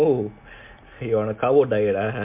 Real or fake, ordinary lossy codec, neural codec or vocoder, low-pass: real; MP3, 32 kbps; none; 3.6 kHz